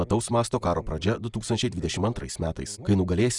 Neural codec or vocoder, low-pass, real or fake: none; 10.8 kHz; real